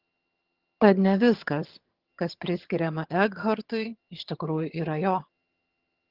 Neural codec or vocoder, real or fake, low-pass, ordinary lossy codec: vocoder, 22.05 kHz, 80 mel bands, HiFi-GAN; fake; 5.4 kHz; Opus, 16 kbps